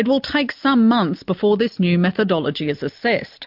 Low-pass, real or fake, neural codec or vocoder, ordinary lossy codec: 5.4 kHz; real; none; MP3, 48 kbps